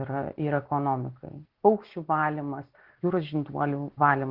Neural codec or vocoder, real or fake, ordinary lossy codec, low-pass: none; real; Opus, 16 kbps; 5.4 kHz